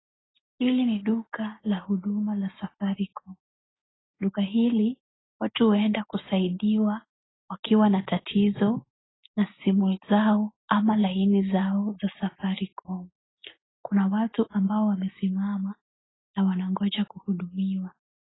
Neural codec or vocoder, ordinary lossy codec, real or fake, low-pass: none; AAC, 16 kbps; real; 7.2 kHz